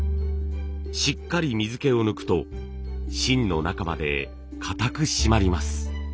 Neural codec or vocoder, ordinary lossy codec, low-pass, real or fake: none; none; none; real